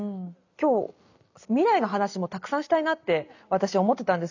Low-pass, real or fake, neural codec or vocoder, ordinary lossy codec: 7.2 kHz; real; none; none